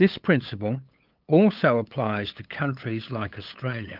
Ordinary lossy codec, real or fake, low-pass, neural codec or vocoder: Opus, 24 kbps; fake; 5.4 kHz; codec, 16 kHz, 8 kbps, FunCodec, trained on LibriTTS, 25 frames a second